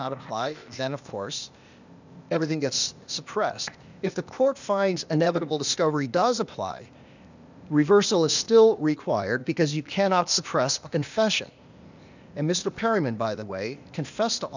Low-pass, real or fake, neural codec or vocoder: 7.2 kHz; fake; codec, 16 kHz, 0.8 kbps, ZipCodec